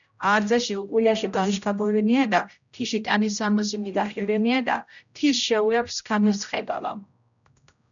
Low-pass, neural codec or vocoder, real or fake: 7.2 kHz; codec, 16 kHz, 0.5 kbps, X-Codec, HuBERT features, trained on general audio; fake